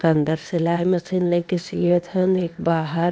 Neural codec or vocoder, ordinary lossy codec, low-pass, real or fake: codec, 16 kHz, 0.8 kbps, ZipCodec; none; none; fake